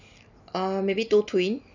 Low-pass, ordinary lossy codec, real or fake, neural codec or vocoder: 7.2 kHz; none; real; none